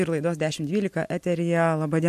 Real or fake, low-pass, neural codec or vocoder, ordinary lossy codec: real; 14.4 kHz; none; MP3, 64 kbps